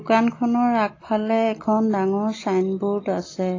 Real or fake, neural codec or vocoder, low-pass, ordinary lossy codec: real; none; 7.2 kHz; AAC, 32 kbps